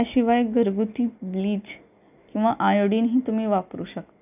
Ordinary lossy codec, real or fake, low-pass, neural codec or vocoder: none; real; 3.6 kHz; none